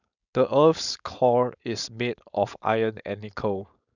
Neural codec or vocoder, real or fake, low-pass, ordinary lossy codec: codec, 16 kHz, 4.8 kbps, FACodec; fake; 7.2 kHz; none